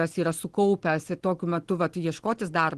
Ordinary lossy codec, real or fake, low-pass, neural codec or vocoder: Opus, 16 kbps; real; 10.8 kHz; none